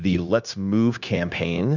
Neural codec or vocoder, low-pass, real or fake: codec, 16 kHz, 0.9 kbps, LongCat-Audio-Codec; 7.2 kHz; fake